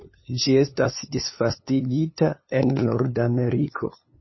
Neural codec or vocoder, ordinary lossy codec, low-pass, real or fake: codec, 16 kHz, 2 kbps, FunCodec, trained on LibriTTS, 25 frames a second; MP3, 24 kbps; 7.2 kHz; fake